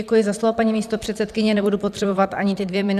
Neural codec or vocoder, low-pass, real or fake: vocoder, 44.1 kHz, 128 mel bands, Pupu-Vocoder; 14.4 kHz; fake